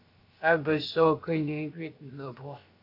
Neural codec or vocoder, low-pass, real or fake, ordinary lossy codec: codec, 16 kHz, about 1 kbps, DyCAST, with the encoder's durations; 5.4 kHz; fake; AAC, 32 kbps